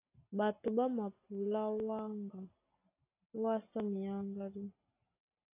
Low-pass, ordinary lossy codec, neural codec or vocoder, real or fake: 3.6 kHz; AAC, 24 kbps; none; real